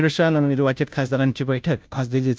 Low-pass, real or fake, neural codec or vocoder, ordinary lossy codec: none; fake; codec, 16 kHz, 0.5 kbps, FunCodec, trained on Chinese and English, 25 frames a second; none